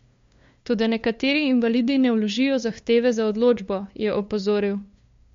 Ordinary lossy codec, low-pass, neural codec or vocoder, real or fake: MP3, 48 kbps; 7.2 kHz; codec, 16 kHz, 2 kbps, FunCodec, trained on LibriTTS, 25 frames a second; fake